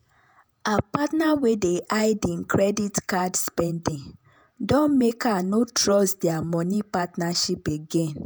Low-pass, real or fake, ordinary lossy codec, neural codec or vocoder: none; fake; none; vocoder, 48 kHz, 128 mel bands, Vocos